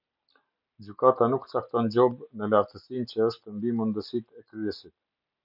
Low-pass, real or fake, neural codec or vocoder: 5.4 kHz; real; none